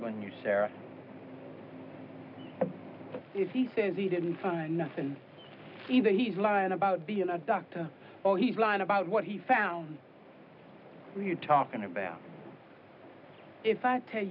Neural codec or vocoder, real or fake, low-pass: none; real; 5.4 kHz